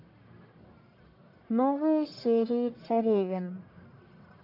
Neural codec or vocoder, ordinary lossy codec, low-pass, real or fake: codec, 44.1 kHz, 1.7 kbps, Pupu-Codec; AAC, 48 kbps; 5.4 kHz; fake